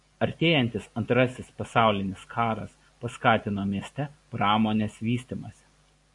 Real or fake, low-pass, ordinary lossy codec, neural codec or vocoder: real; 10.8 kHz; AAC, 64 kbps; none